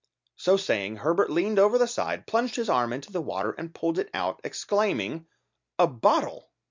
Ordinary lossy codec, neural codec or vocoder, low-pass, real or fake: MP3, 64 kbps; none; 7.2 kHz; real